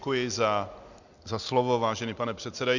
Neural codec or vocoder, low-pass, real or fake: none; 7.2 kHz; real